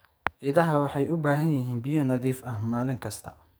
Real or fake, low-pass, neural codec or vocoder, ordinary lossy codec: fake; none; codec, 44.1 kHz, 2.6 kbps, SNAC; none